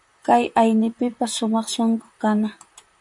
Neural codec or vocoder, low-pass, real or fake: vocoder, 44.1 kHz, 128 mel bands, Pupu-Vocoder; 10.8 kHz; fake